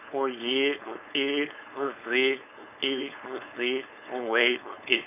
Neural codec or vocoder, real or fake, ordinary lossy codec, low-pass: codec, 16 kHz, 4.8 kbps, FACodec; fake; none; 3.6 kHz